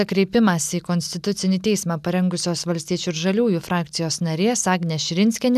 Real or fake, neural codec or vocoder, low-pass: real; none; 14.4 kHz